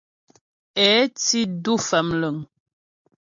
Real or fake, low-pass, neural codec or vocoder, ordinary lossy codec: real; 7.2 kHz; none; MP3, 64 kbps